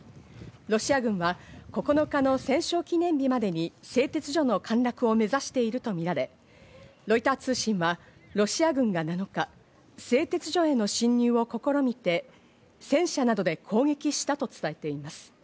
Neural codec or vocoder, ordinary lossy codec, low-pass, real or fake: none; none; none; real